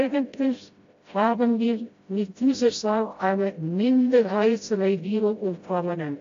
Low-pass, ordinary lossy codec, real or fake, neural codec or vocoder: 7.2 kHz; AAC, 48 kbps; fake; codec, 16 kHz, 0.5 kbps, FreqCodec, smaller model